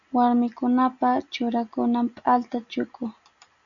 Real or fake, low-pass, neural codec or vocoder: real; 7.2 kHz; none